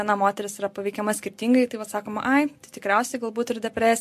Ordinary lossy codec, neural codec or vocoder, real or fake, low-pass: MP3, 64 kbps; none; real; 14.4 kHz